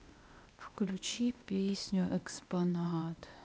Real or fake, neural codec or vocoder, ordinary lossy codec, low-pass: fake; codec, 16 kHz, 0.8 kbps, ZipCodec; none; none